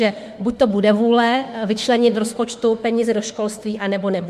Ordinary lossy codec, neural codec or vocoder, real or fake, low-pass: MP3, 64 kbps; autoencoder, 48 kHz, 32 numbers a frame, DAC-VAE, trained on Japanese speech; fake; 14.4 kHz